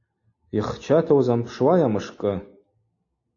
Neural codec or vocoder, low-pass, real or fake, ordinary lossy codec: none; 7.2 kHz; real; AAC, 32 kbps